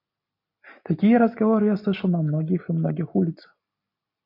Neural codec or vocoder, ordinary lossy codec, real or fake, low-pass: none; MP3, 48 kbps; real; 5.4 kHz